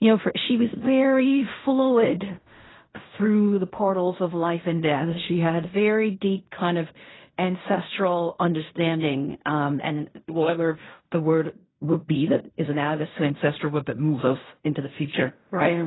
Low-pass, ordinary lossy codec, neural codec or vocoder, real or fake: 7.2 kHz; AAC, 16 kbps; codec, 16 kHz in and 24 kHz out, 0.4 kbps, LongCat-Audio-Codec, fine tuned four codebook decoder; fake